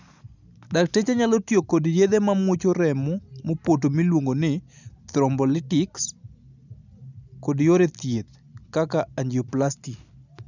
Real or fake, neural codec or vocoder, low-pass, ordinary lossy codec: real; none; 7.2 kHz; none